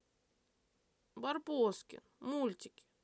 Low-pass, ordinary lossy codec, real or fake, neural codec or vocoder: none; none; real; none